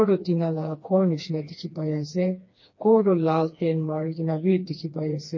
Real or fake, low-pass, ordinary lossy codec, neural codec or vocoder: fake; 7.2 kHz; MP3, 32 kbps; codec, 16 kHz, 2 kbps, FreqCodec, smaller model